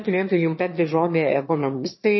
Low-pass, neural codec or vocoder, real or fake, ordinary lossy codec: 7.2 kHz; autoencoder, 22.05 kHz, a latent of 192 numbers a frame, VITS, trained on one speaker; fake; MP3, 24 kbps